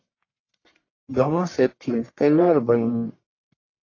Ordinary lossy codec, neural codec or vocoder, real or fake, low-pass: AAC, 32 kbps; codec, 44.1 kHz, 1.7 kbps, Pupu-Codec; fake; 7.2 kHz